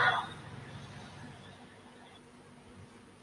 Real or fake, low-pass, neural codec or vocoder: real; 10.8 kHz; none